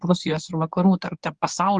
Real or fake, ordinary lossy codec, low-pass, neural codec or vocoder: fake; Opus, 24 kbps; 10.8 kHz; codec, 24 kHz, 0.9 kbps, WavTokenizer, medium speech release version 1